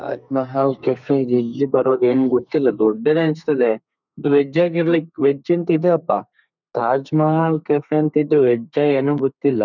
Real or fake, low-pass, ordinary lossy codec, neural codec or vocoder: fake; 7.2 kHz; none; codec, 32 kHz, 1.9 kbps, SNAC